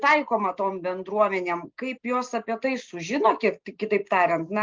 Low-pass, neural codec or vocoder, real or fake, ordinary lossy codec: 7.2 kHz; none; real; Opus, 24 kbps